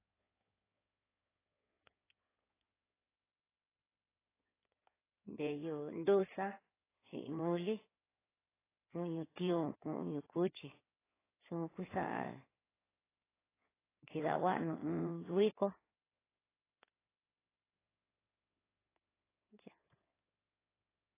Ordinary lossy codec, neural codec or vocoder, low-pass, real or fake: AAC, 16 kbps; codec, 16 kHz in and 24 kHz out, 2.2 kbps, FireRedTTS-2 codec; 3.6 kHz; fake